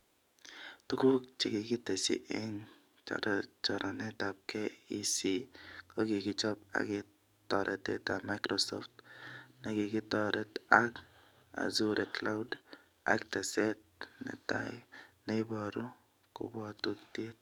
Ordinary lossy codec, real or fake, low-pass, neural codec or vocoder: none; fake; 19.8 kHz; codec, 44.1 kHz, 7.8 kbps, DAC